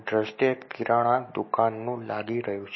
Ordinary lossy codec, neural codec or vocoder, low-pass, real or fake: MP3, 24 kbps; none; 7.2 kHz; real